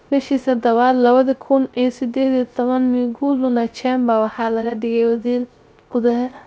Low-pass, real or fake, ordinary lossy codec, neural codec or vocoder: none; fake; none; codec, 16 kHz, 0.3 kbps, FocalCodec